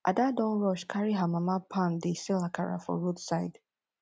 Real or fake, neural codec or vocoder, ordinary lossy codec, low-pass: real; none; none; none